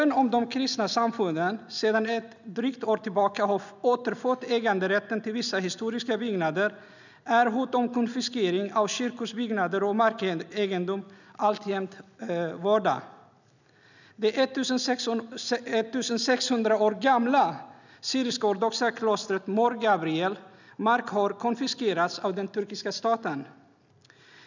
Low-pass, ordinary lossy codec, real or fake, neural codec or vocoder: 7.2 kHz; none; real; none